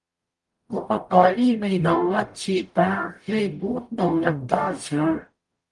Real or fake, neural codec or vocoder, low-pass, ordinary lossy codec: fake; codec, 44.1 kHz, 0.9 kbps, DAC; 10.8 kHz; Opus, 32 kbps